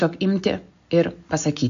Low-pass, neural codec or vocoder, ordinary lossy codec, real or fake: 7.2 kHz; none; MP3, 48 kbps; real